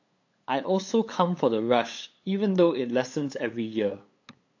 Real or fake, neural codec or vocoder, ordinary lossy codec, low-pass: fake; codec, 16 kHz, 8 kbps, FunCodec, trained on LibriTTS, 25 frames a second; AAC, 48 kbps; 7.2 kHz